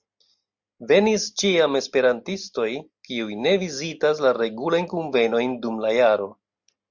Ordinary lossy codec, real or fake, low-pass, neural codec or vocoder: Opus, 64 kbps; real; 7.2 kHz; none